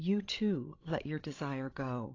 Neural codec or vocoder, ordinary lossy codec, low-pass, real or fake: codec, 16 kHz, 16 kbps, FreqCodec, larger model; AAC, 32 kbps; 7.2 kHz; fake